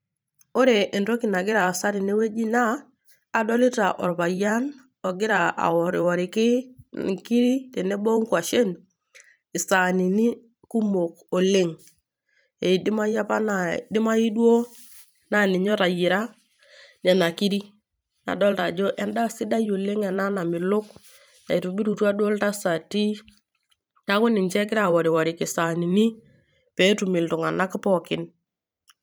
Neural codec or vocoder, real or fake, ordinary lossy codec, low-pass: vocoder, 44.1 kHz, 128 mel bands every 512 samples, BigVGAN v2; fake; none; none